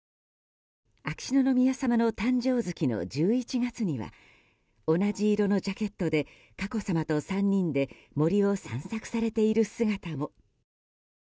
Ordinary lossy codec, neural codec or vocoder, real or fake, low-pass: none; none; real; none